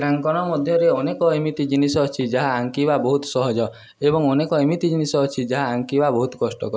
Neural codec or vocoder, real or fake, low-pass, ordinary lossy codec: none; real; none; none